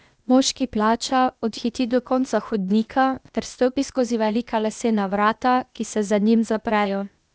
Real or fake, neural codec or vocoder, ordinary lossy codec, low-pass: fake; codec, 16 kHz, 0.8 kbps, ZipCodec; none; none